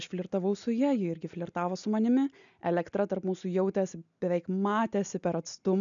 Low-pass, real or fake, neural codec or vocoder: 7.2 kHz; real; none